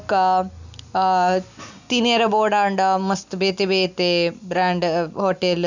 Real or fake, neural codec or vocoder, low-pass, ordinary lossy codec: real; none; 7.2 kHz; none